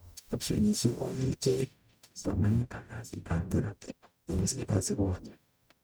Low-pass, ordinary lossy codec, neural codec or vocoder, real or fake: none; none; codec, 44.1 kHz, 0.9 kbps, DAC; fake